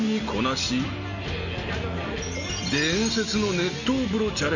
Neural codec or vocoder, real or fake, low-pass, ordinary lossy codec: none; real; 7.2 kHz; none